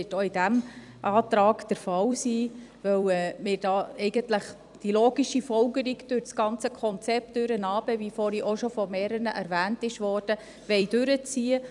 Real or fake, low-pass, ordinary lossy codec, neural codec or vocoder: fake; 10.8 kHz; none; vocoder, 44.1 kHz, 128 mel bands every 256 samples, BigVGAN v2